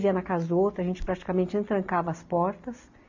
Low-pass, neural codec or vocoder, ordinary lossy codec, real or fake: 7.2 kHz; none; AAC, 48 kbps; real